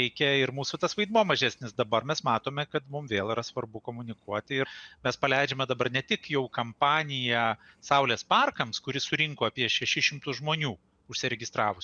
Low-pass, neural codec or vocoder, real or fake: 9.9 kHz; none; real